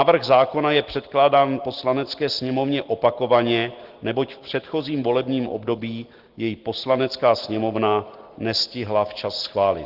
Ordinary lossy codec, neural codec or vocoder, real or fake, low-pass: Opus, 16 kbps; none; real; 5.4 kHz